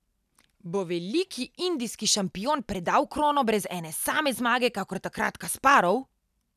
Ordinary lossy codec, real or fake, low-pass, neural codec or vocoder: none; real; 14.4 kHz; none